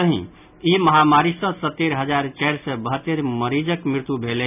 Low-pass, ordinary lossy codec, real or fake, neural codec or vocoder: 3.6 kHz; none; real; none